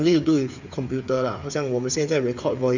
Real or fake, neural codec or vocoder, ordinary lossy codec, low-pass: fake; codec, 16 kHz, 8 kbps, FreqCodec, smaller model; Opus, 64 kbps; 7.2 kHz